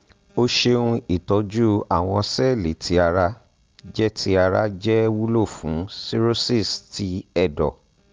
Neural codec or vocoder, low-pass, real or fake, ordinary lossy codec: none; 7.2 kHz; real; Opus, 24 kbps